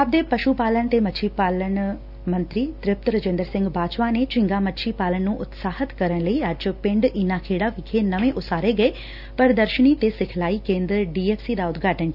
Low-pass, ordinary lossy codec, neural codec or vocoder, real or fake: 5.4 kHz; none; none; real